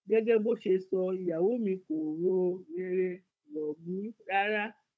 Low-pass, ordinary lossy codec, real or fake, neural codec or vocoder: none; none; fake; codec, 16 kHz, 16 kbps, FunCodec, trained on Chinese and English, 50 frames a second